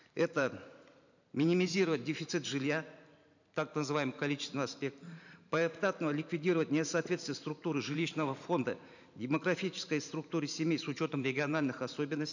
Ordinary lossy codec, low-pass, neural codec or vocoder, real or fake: none; 7.2 kHz; vocoder, 44.1 kHz, 128 mel bands every 512 samples, BigVGAN v2; fake